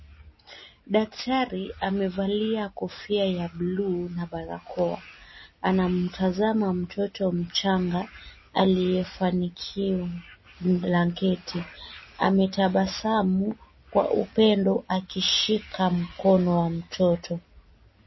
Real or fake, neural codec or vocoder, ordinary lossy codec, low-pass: real; none; MP3, 24 kbps; 7.2 kHz